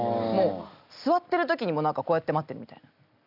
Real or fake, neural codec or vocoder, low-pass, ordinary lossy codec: real; none; 5.4 kHz; none